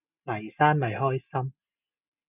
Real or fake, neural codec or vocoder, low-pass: real; none; 3.6 kHz